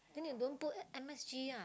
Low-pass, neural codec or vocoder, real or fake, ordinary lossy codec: none; none; real; none